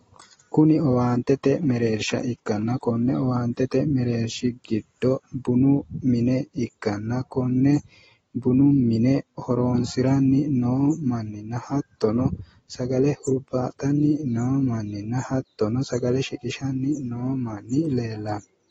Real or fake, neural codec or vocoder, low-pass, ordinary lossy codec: real; none; 19.8 kHz; AAC, 24 kbps